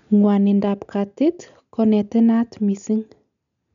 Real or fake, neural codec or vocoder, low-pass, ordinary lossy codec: real; none; 7.2 kHz; none